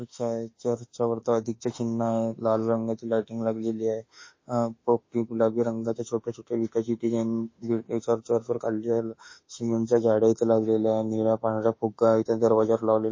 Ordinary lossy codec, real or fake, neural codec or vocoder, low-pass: MP3, 32 kbps; fake; autoencoder, 48 kHz, 32 numbers a frame, DAC-VAE, trained on Japanese speech; 7.2 kHz